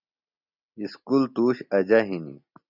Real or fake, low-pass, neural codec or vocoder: real; 5.4 kHz; none